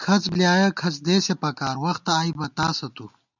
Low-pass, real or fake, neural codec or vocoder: 7.2 kHz; real; none